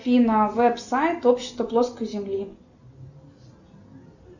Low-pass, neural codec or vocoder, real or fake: 7.2 kHz; none; real